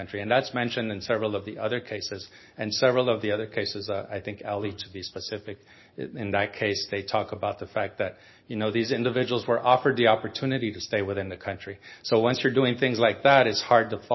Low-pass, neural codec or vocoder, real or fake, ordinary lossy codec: 7.2 kHz; none; real; MP3, 24 kbps